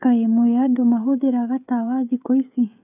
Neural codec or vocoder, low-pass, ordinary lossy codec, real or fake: codec, 16 kHz, 16 kbps, FreqCodec, smaller model; 3.6 kHz; none; fake